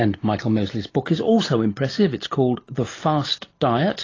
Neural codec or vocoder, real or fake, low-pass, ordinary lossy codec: none; real; 7.2 kHz; AAC, 32 kbps